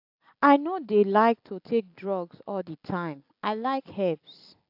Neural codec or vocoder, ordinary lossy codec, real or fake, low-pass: none; AAC, 48 kbps; real; 5.4 kHz